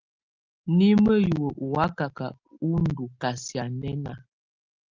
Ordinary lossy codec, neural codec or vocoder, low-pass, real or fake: Opus, 32 kbps; none; 7.2 kHz; real